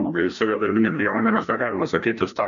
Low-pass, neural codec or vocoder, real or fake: 7.2 kHz; codec, 16 kHz, 1 kbps, FreqCodec, larger model; fake